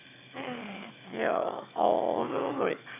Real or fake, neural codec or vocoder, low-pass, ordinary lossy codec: fake; autoencoder, 22.05 kHz, a latent of 192 numbers a frame, VITS, trained on one speaker; 3.6 kHz; none